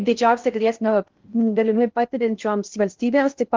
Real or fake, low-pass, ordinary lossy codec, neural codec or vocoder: fake; 7.2 kHz; Opus, 32 kbps; codec, 16 kHz in and 24 kHz out, 0.6 kbps, FocalCodec, streaming, 4096 codes